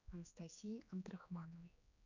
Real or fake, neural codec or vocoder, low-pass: fake; codec, 16 kHz, 2 kbps, X-Codec, HuBERT features, trained on general audio; 7.2 kHz